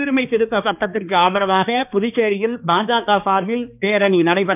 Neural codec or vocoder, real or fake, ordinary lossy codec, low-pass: codec, 16 kHz, 2 kbps, X-Codec, HuBERT features, trained on balanced general audio; fake; none; 3.6 kHz